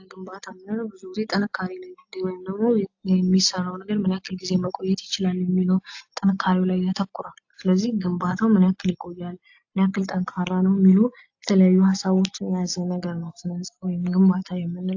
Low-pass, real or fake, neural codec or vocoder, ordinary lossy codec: 7.2 kHz; real; none; AAC, 48 kbps